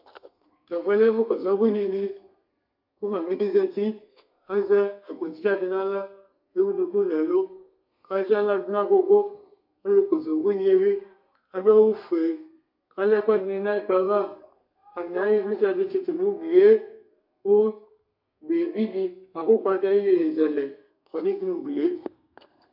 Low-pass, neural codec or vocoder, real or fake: 5.4 kHz; codec, 32 kHz, 1.9 kbps, SNAC; fake